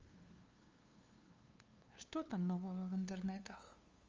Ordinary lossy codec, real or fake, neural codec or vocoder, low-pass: Opus, 32 kbps; fake; codec, 16 kHz, 2 kbps, FunCodec, trained on LibriTTS, 25 frames a second; 7.2 kHz